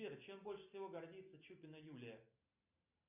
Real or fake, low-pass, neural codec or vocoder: real; 3.6 kHz; none